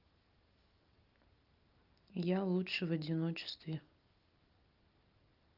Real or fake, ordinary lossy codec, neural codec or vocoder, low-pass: real; Opus, 24 kbps; none; 5.4 kHz